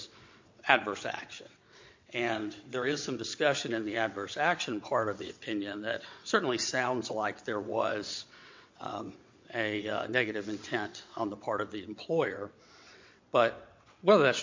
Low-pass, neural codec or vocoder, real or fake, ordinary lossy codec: 7.2 kHz; vocoder, 22.05 kHz, 80 mel bands, WaveNeXt; fake; MP3, 48 kbps